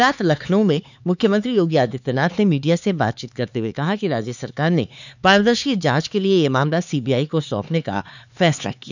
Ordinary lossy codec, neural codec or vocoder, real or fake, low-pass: none; codec, 16 kHz, 4 kbps, X-Codec, HuBERT features, trained on LibriSpeech; fake; 7.2 kHz